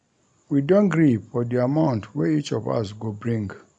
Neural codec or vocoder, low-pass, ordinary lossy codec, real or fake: none; 9.9 kHz; none; real